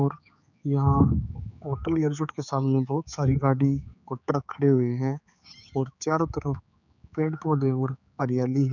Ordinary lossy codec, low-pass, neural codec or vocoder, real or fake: none; 7.2 kHz; codec, 16 kHz, 4 kbps, X-Codec, HuBERT features, trained on general audio; fake